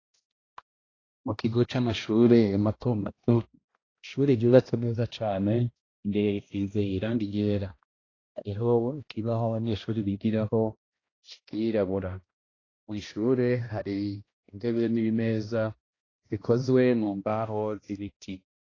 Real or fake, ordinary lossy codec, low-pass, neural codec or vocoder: fake; AAC, 32 kbps; 7.2 kHz; codec, 16 kHz, 1 kbps, X-Codec, HuBERT features, trained on balanced general audio